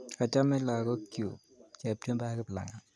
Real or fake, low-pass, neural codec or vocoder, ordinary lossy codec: real; none; none; none